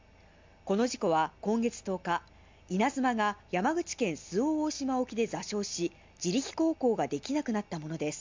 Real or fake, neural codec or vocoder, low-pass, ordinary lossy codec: real; none; 7.2 kHz; MP3, 64 kbps